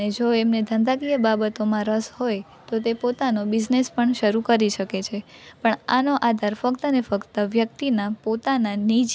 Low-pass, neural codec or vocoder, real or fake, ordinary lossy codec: none; none; real; none